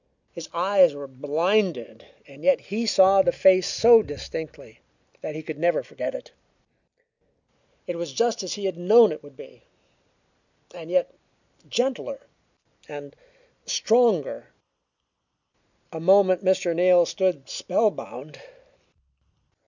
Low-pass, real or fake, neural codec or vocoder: 7.2 kHz; real; none